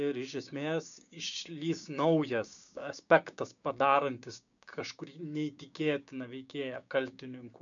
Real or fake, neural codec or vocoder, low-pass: fake; codec, 16 kHz, 6 kbps, DAC; 7.2 kHz